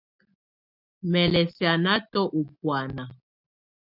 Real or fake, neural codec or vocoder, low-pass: real; none; 5.4 kHz